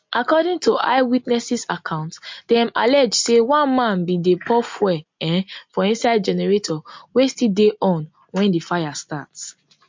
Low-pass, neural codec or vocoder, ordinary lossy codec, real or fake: 7.2 kHz; none; MP3, 48 kbps; real